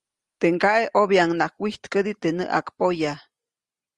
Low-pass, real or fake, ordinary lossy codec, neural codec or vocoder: 10.8 kHz; real; Opus, 32 kbps; none